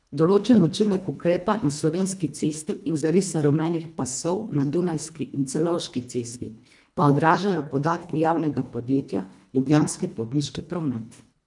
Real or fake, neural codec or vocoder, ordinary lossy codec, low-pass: fake; codec, 24 kHz, 1.5 kbps, HILCodec; none; 10.8 kHz